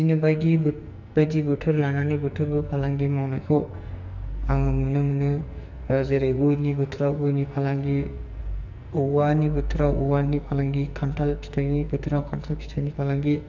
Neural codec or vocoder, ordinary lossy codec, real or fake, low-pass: codec, 44.1 kHz, 2.6 kbps, SNAC; none; fake; 7.2 kHz